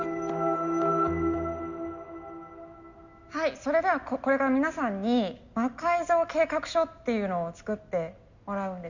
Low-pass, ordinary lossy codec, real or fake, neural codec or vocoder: 7.2 kHz; Opus, 64 kbps; real; none